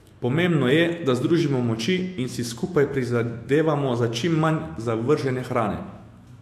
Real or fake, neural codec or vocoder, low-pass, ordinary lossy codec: fake; autoencoder, 48 kHz, 128 numbers a frame, DAC-VAE, trained on Japanese speech; 14.4 kHz; AAC, 64 kbps